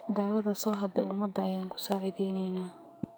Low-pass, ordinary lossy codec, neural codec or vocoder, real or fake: none; none; codec, 44.1 kHz, 2.6 kbps, SNAC; fake